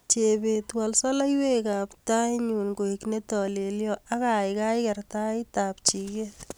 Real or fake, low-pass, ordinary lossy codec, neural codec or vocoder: real; none; none; none